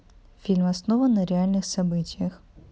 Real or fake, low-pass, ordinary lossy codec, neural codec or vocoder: real; none; none; none